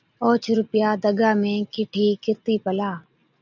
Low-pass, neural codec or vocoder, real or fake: 7.2 kHz; none; real